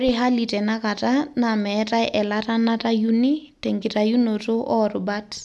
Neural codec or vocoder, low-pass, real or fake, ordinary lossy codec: none; none; real; none